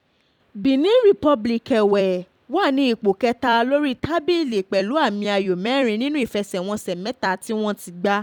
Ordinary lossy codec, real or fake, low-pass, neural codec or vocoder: none; fake; 19.8 kHz; vocoder, 44.1 kHz, 128 mel bands every 256 samples, BigVGAN v2